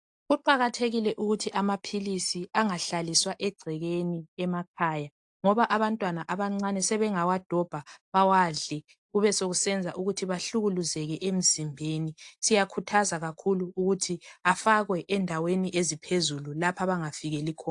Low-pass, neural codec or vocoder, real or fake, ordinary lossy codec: 10.8 kHz; none; real; AAC, 64 kbps